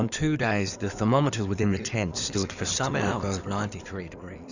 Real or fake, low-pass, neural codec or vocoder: fake; 7.2 kHz; codec, 16 kHz in and 24 kHz out, 2.2 kbps, FireRedTTS-2 codec